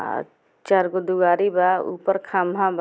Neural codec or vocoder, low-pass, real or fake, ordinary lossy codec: none; none; real; none